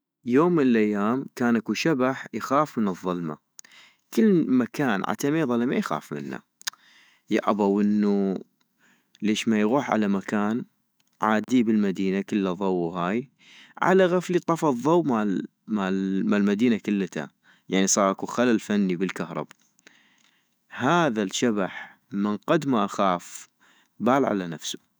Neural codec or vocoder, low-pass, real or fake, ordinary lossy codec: autoencoder, 48 kHz, 128 numbers a frame, DAC-VAE, trained on Japanese speech; none; fake; none